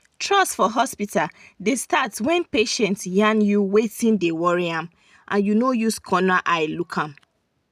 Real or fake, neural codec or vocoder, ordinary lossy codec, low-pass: real; none; none; 14.4 kHz